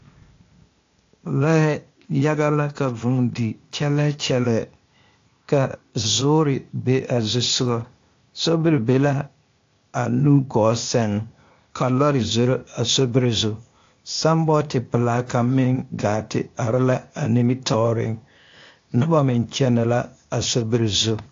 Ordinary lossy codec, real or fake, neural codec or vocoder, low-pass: AAC, 48 kbps; fake; codec, 16 kHz, 0.8 kbps, ZipCodec; 7.2 kHz